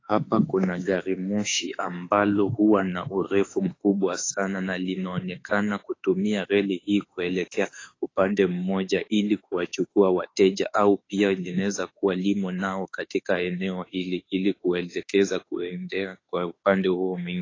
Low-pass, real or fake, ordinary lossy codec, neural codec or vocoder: 7.2 kHz; fake; AAC, 32 kbps; autoencoder, 48 kHz, 32 numbers a frame, DAC-VAE, trained on Japanese speech